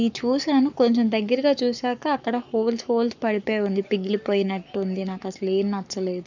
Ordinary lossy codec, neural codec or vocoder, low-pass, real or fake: none; codec, 44.1 kHz, 7.8 kbps, Pupu-Codec; 7.2 kHz; fake